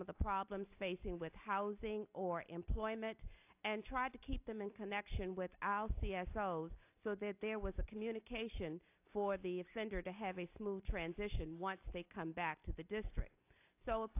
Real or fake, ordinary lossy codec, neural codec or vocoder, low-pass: real; AAC, 32 kbps; none; 3.6 kHz